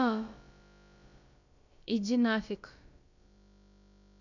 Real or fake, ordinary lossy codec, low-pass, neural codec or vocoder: fake; none; 7.2 kHz; codec, 16 kHz, about 1 kbps, DyCAST, with the encoder's durations